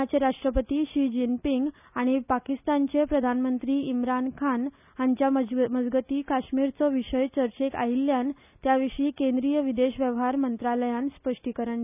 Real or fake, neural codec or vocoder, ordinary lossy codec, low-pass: real; none; none; 3.6 kHz